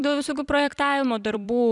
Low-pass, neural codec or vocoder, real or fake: 10.8 kHz; vocoder, 44.1 kHz, 128 mel bands every 256 samples, BigVGAN v2; fake